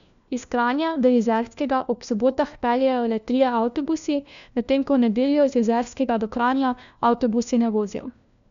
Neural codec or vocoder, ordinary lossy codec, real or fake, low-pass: codec, 16 kHz, 1 kbps, FunCodec, trained on LibriTTS, 50 frames a second; none; fake; 7.2 kHz